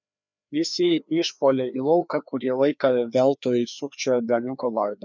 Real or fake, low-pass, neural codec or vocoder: fake; 7.2 kHz; codec, 16 kHz, 2 kbps, FreqCodec, larger model